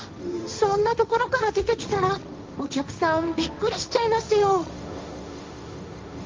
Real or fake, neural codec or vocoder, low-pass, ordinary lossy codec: fake; codec, 16 kHz, 1.1 kbps, Voila-Tokenizer; 7.2 kHz; Opus, 32 kbps